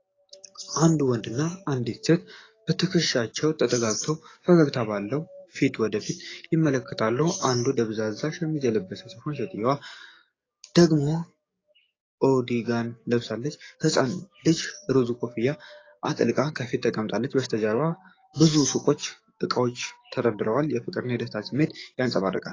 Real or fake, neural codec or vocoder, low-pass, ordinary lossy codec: fake; codec, 44.1 kHz, 7.8 kbps, DAC; 7.2 kHz; AAC, 32 kbps